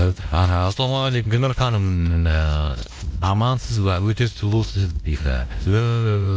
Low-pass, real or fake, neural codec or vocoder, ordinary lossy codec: none; fake; codec, 16 kHz, 1 kbps, X-Codec, WavLM features, trained on Multilingual LibriSpeech; none